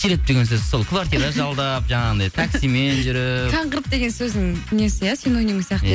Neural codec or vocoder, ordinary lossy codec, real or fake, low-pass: none; none; real; none